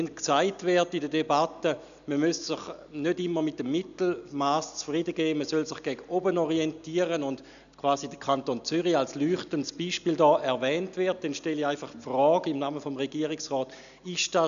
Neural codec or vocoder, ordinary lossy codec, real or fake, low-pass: none; none; real; 7.2 kHz